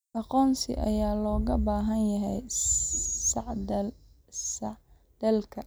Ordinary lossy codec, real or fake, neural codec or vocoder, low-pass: none; real; none; none